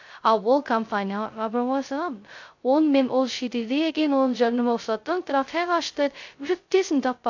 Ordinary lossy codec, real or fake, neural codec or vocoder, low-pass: AAC, 48 kbps; fake; codec, 16 kHz, 0.2 kbps, FocalCodec; 7.2 kHz